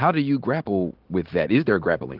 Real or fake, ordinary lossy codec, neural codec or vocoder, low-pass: real; Opus, 16 kbps; none; 5.4 kHz